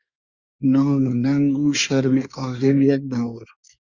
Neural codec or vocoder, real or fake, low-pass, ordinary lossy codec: codec, 24 kHz, 1 kbps, SNAC; fake; 7.2 kHz; Opus, 64 kbps